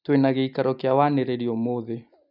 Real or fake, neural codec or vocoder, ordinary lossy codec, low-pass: real; none; none; 5.4 kHz